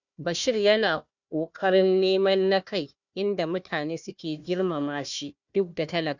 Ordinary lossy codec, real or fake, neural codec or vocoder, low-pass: none; fake; codec, 16 kHz, 1 kbps, FunCodec, trained on Chinese and English, 50 frames a second; 7.2 kHz